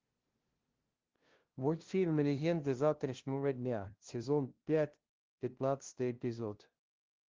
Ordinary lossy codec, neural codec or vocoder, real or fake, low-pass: Opus, 16 kbps; codec, 16 kHz, 0.5 kbps, FunCodec, trained on LibriTTS, 25 frames a second; fake; 7.2 kHz